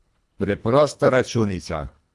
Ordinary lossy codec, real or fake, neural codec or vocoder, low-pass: none; fake; codec, 24 kHz, 1.5 kbps, HILCodec; none